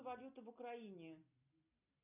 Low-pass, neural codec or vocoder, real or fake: 3.6 kHz; none; real